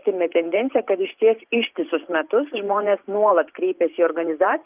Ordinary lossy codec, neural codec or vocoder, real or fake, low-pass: Opus, 24 kbps; vocoder, 44.1 kHz, 128 mel bands every 512 samples, BigVGAN v2; fake; 3.6 kHz